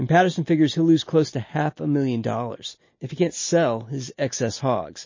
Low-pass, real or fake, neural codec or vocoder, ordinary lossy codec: 7.2 kHz; real; none; MP3, 32 kbps